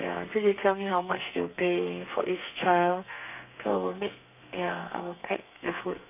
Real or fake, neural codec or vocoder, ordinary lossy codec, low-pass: fake; codec, 32 kHz, 1.9 kbps, SNAC; none; 3.6 kHz